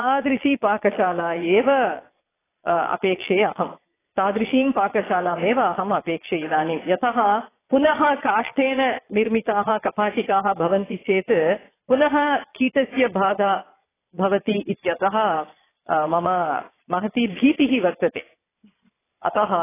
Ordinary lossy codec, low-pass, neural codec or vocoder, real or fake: AAC, 16 kbps; 3.6 kHz; vocoder, 22.05 kHz, 80 mel bands, Vocos; fake